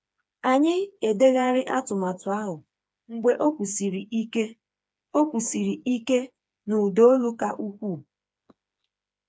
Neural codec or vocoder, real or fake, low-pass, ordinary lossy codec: codec, 16 kHz, 4 kbps, FreqCodec, smaller model; fake; none; none